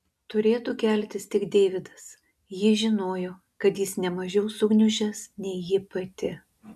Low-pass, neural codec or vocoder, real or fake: 14.4 kHz; none; real